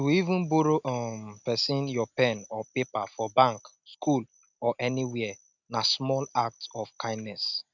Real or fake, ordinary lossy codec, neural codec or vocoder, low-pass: real; none; none; 7.2 kHz